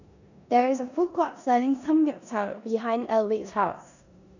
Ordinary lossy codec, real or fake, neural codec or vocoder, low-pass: none; fake; codec, 16 kHz in and 24 kHz out, 0.9 kbps, LongCat-Audio-Codec, four codebook decoder; 7.2 kHz